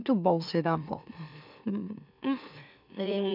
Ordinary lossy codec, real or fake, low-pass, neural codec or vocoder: none; fake; 5.4 kHz; autoencoder, 44.1 kHz, a latent of 192 numbers a frame, MeloTTS